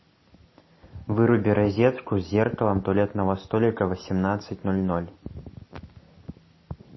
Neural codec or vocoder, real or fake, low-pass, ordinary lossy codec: none; real; 7.2 kHz; MP3, 24 kbps